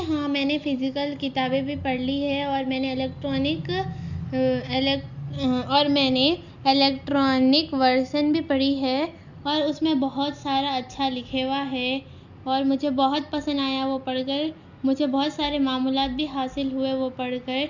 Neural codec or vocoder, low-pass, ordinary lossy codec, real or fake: none; 7.2 kHz; none; real